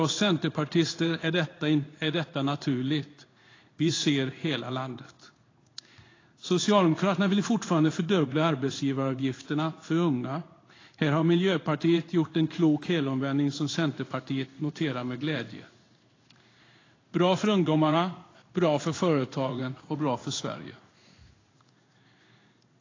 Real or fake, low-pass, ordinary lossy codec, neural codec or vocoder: fake; 7.2 kHz; AAC, 32 kbps; codec, 16 kHz in and 24 kHz out, 1 kbps, XY-Tokenizer